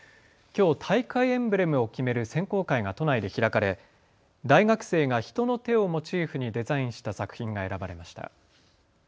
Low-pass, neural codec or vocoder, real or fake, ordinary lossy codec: none; none; real; none